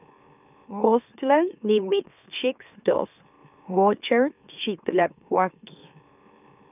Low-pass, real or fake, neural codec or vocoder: 3.6 kHz; fake; autoencoder, 44.1 kHz, a latent of 192 numbers a frame, MeloTTS